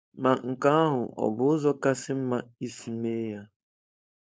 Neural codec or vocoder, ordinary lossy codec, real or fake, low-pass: codec, 16 kHz, 4.8 kbps, FACodec; none; fake; none